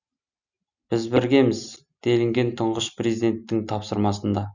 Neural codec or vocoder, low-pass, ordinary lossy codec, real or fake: none; 7.2 kHz; none; real